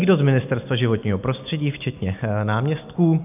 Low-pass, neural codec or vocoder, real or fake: 3.6 kHz; none; real